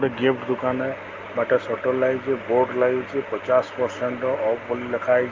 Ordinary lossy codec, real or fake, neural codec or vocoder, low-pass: Opus, 32 kbps; real; none; 7.2 kHz